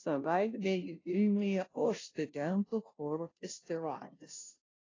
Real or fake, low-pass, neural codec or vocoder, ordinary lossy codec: fake; 7.2 kHz; codec, 16 kHz, 0.5 kbps, FunCodec, trained on Chinese and English, 25 frames a second; AAC, 32 kbps